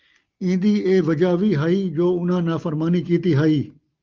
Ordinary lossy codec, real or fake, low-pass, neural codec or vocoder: Opus, 32 kbps; real; 7.2 kHz; none